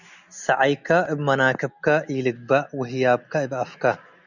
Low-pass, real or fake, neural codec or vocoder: 7.2 kHz; real; none